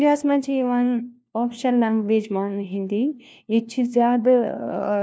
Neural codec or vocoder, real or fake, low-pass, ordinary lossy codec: codec, 16 kHz, 1 kbps, FunCodec, trained on LibriTTS, 50 frames a second; fake; none; none